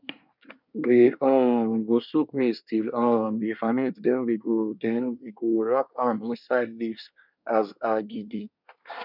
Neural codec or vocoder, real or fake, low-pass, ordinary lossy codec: codec, 24 kHz, 1 kbps, SNAC; fake; 5.4 kHz; none